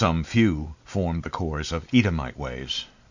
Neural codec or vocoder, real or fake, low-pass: none; real; 7.2 kHz